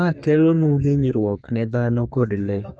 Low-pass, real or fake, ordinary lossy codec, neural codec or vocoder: 9.9 kHz; fake; Opus, 64 kbps; codec, 32 kHz, 1.9 kbps, SNAC